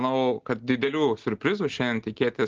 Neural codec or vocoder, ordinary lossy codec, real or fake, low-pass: none; Opus, 16 kbps; real; 7.2 kHz